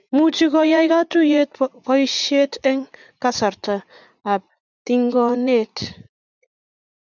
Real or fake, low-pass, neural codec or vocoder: fake; 7.2 kHz; vocoder, 44.1 kHz, 80 mel bands, Vocos